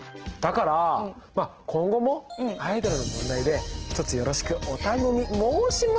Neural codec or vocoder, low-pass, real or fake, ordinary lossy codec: none; 7.2 kHz; real; Opus, 16 kbps